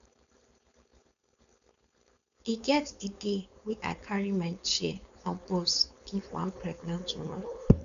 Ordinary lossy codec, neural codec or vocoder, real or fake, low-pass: none; codec, 16 kHz, 4.8 kbps, FACodec; fake; 7.2 kHz